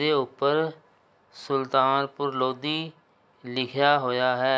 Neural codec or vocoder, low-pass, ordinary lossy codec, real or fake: none; none; none; real